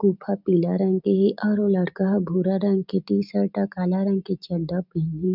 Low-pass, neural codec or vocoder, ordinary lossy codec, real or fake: 5.4 kHz; none; none; real